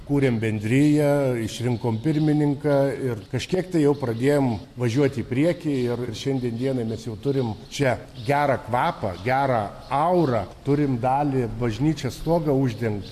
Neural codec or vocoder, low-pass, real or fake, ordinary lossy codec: none; 14.4 kHz; real; AAC, 64 kbps